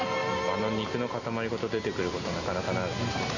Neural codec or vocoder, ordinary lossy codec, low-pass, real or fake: none; none; 7.2 kHz; real